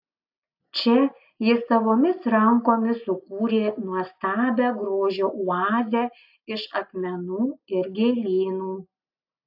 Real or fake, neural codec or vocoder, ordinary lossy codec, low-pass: real; none; AAC, 48 kbps; 5.4 kHz